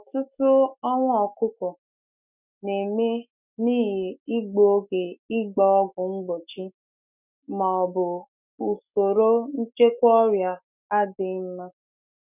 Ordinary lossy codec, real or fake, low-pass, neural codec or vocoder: none; fake; 3.6 kHz; autoencoder, 48 kHz, 128 numbers a frame, DAC-VAE, trained on Japanese speech